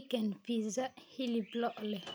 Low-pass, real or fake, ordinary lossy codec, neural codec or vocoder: none; real; none; none